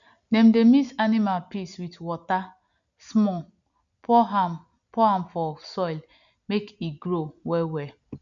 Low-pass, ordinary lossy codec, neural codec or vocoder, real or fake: 7.2 kHz; none; none; real